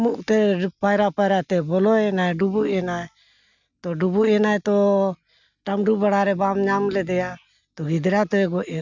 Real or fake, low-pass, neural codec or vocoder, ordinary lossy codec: real; 7.2 kHz; none; none